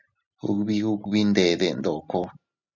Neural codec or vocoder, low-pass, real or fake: none; 7.2 kHz; real